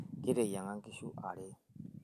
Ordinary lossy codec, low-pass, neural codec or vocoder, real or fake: none; 14.4 kHz; none; real